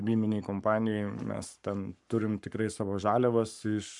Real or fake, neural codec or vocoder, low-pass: fake; codec, 44.1 kHz, 7.8 kbps, Pupu-Codec; 10.8 kHz